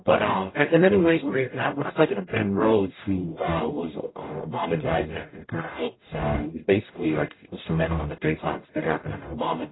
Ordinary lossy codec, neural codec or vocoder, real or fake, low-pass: AAC, 16 kbps; codec, 44.1 kHz, 0.9 kbps, DAC; fake; 7.2 kHz